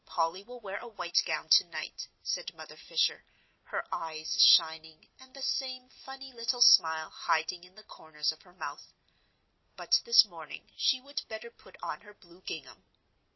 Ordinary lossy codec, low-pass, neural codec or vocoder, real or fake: MP3, 24 kbps; 7.2 kHz; none; real